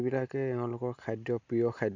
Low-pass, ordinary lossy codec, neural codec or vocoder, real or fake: 7.2 kHz; MP3, 64 kbps; none; real